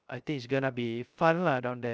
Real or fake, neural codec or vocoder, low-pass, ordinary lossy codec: fake; codec, 16 kHz, 0.3 kbps, FocalCodec; none; none